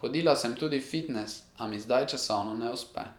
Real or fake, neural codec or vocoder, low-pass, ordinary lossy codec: fake; vocoder, 44.1 kHz, 128 mel bands every 512 samples, BigVGAN v2; 19.8 kHz; none